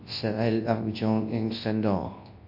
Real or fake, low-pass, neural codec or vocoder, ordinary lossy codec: fake; 5.4 kHz; codec, 24 kHz, 0.9 kbps, WavTokenizer, large speech release; MP3, 32 kbps